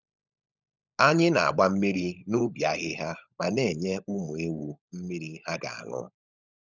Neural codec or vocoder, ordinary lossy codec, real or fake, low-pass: codec, 16 kHz, 16 kbps, FunCodec, trained on LibriTTS, 50 frames a second; none; fake; 7.2 kHz